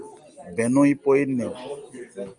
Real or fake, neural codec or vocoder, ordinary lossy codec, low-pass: real; none; Opus, 32 kbps; 9.9 kHz